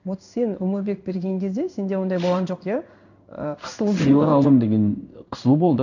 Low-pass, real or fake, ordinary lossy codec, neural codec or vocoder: 7.2 kHz; fake; none; codec, 16 kHz in and 24 kHz out, 1 kbps, XY-Tokenizer